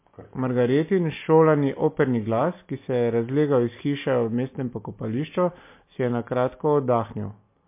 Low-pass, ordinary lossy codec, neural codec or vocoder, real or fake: 3.6 kHz; MP3, 24 kbps; none; real